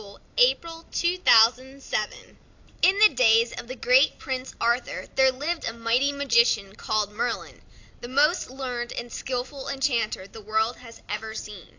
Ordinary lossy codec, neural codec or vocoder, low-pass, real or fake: AAC, 48 kbps; none; 7.2 kHz; real